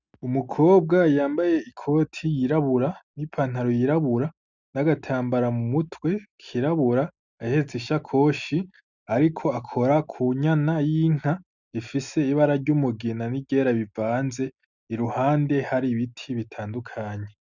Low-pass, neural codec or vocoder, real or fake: 7.2 kHz; none; real